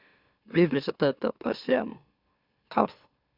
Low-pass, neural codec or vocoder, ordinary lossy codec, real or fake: 5.4 kHz; autoencoder, 44.1 kHz, a latent of 192 numbers a frame, MeloTTS; Opus, 64 kbps; fake